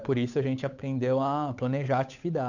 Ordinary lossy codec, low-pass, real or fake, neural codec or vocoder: none; 7.2 kHz; fake; codec, 16 kHz, 8 kbps, FunCodec, trained on Chinese and English, 25 frames a second